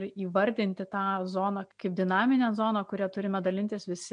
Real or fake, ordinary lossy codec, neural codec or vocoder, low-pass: real; MP3, 64 kbps; none; 9.9 kHz